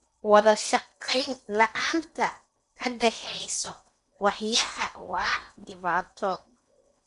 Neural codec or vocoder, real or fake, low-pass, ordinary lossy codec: codec, 16 kHz in and 24 kHz out, 0.8 kbps, FocalCodec, streaming, 65536 codes; fake; 10.8 kHz; none